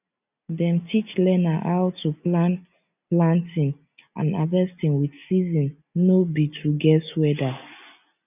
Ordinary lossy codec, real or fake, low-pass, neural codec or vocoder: none; real; 3.6 kHz; none